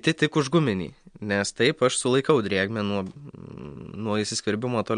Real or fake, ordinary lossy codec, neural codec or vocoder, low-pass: real; MP3, 64 kbps; none; 9.9 kHz